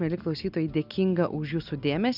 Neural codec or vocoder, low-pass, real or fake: none; 5.4 kHz; real